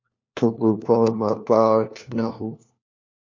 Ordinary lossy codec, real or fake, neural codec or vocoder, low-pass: MP3, 64 kbps; fake; codec, 16 kHz, 1 kbps, FunCodec, trained on LibriTTS, 50 frames a second; 7.2 kHz